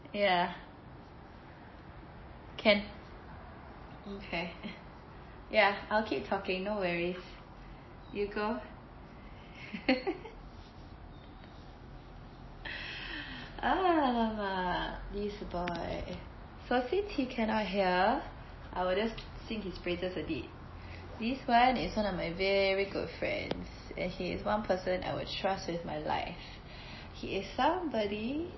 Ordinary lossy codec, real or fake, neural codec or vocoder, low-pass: MP3, 24 kbps; real; none; 7.2 kHz